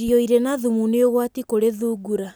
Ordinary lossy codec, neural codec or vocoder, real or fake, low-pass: none; none; real; none